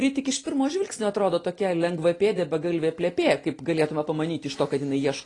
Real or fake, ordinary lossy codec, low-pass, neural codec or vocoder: real; AAC, 32 kbps; 10.8 kHz; none